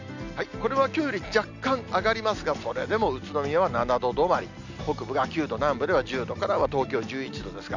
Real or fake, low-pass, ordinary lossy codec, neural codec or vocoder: real; 7.2 kHz; none; none